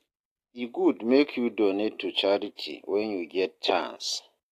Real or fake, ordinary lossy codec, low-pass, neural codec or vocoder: real; AAC, 64 kbps; 14.4 kHz; none